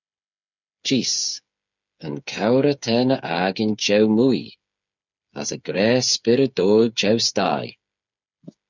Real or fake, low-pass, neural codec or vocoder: fake; 7.2 kHz; codec, 16 kHz, 8 kbps, FreqCodec, smaller model